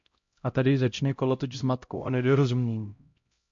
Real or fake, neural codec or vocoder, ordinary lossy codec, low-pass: fake; codec, 16 kHz, 0.5 kbps, X-Codec, HuBERT features, trained on LibriSpeech; MP3, 48 kbps; 7.2 kHz